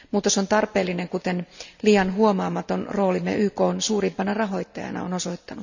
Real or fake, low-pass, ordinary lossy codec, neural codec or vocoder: real; 7.2 kHz; none; none